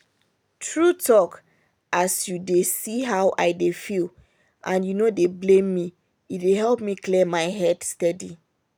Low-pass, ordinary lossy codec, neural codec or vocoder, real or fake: none; none; none; real